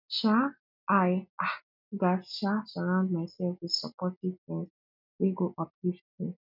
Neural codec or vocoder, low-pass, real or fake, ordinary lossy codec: none; 5.4 kHz; real; none